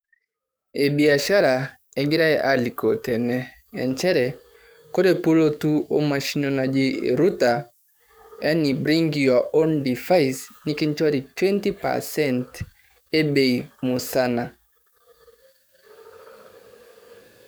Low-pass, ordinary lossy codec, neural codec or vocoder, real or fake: none; none; codec, 44.1 kHz, 7.8 kbps, DAC; fake